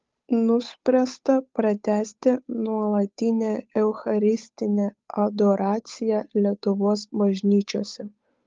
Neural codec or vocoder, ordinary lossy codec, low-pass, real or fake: codec, 16 kHz, 8 kbps, FunCodec, trained on Chinese and English, 25 frames a second; Opus, 32 kbps; 7.2 kHz; fake